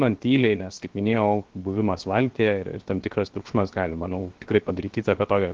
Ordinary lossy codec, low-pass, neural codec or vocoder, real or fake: Opus, 16 kbps; 7.2 kHz; codec, 16 kHz, 0.7 kbps, FocalCodec; fake